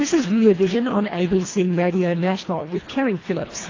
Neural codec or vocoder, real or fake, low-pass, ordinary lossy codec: codec, 24 kHz, 1.5 kbps, HILCodec; fake; 7.2 kHz; AAC, 32 kbps